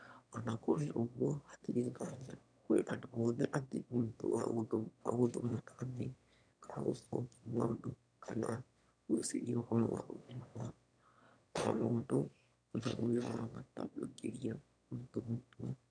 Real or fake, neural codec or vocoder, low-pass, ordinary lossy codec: fake; autoencoder, 22.05 kHz, a latent of 192 numbers a frame, VITS, trained on one speaker; 9.9 kHz; none